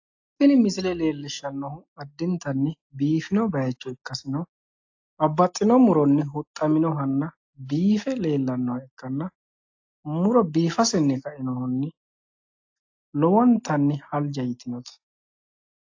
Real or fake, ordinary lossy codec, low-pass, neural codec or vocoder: real; AAC, 48 kbps; 7.2 kHz; none